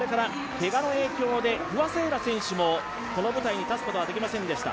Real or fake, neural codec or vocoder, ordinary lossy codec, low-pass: real; none; none; none